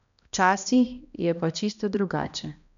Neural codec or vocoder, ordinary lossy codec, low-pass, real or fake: codec, 16 kHz, 1 kbps, X-Codec, HuBERT features, trained on balanced general audio; none; 7.2 kHz; fake